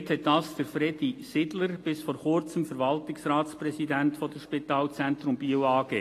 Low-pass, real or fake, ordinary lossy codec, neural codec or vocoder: 14.4 kHz; real; AAC, 48 kbps; none